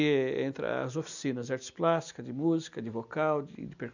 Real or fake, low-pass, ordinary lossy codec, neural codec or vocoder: real; 7.2 kHz; none; none